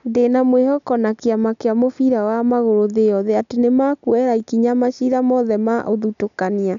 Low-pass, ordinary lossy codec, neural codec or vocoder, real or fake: 7.2 kHz; none; none; real